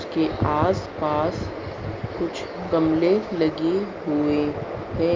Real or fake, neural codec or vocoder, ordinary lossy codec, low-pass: real; none; Opus, 24 kbps; 7.2 kHz